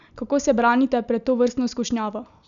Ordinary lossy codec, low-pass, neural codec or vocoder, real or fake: none; 7.2 kHz; none; real